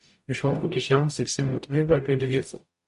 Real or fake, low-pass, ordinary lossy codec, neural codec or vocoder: fake; 14.4 kHz; MP3, 48 kbps; codec, 44.1 kHz, 0.9 kbps, DAC